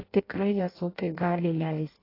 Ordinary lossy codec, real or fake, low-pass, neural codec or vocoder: AAC, 24 kbps; fake; 5.4 kHz; codec, 16 kHz in and 24 kHz out, 0.6 kbps, FireRedTTS-2 codec